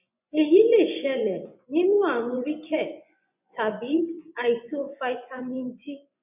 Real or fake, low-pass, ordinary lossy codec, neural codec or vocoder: fake; 3.6 kHz; none; vocoder, 24 kHz, 100 mel bands, Vocos